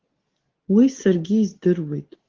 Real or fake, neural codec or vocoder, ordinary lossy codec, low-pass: real; none; Opus, 16 kbps; 7.2 kHz